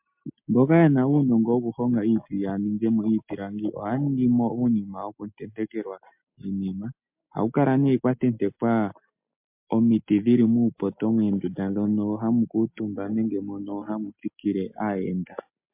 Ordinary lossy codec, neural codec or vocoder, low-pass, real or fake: MP3, 32 kbps; none; 3.6 kHz; real